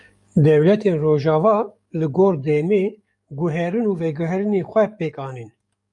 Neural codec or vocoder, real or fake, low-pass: codec, 44.1 kHz, 7.8 kbps, DAC; fake; 10.8 kHz